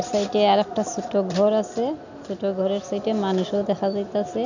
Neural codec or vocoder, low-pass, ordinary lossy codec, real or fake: none; 7.2 kHz; none; real